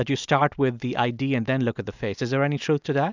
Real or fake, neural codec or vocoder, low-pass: real; none; 7.2 kHz